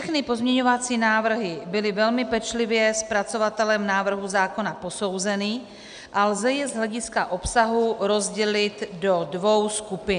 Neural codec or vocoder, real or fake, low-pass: none; real; 9.9 kHz